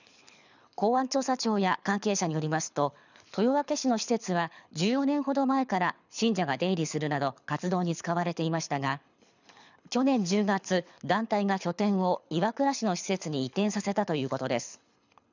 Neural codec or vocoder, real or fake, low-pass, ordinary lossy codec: codec, 24 kHz, 6 kbps, HILCodec; fake; 7.2 kHz; none